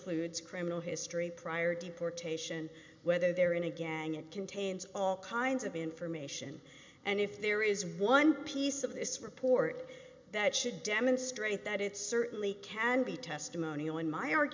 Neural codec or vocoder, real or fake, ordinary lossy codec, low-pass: none; real; MP3, 64 kbps; 7.2 kHz